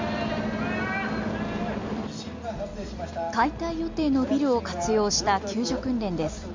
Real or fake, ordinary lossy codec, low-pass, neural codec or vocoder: real; MP3, 48 kbps; 7.2 kHz; none